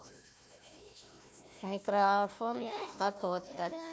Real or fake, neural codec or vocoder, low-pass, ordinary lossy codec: fake; codec, 16 kHz, 1 kbps, FunCodec, trained on Chinese and English, 50 frames a second; none; none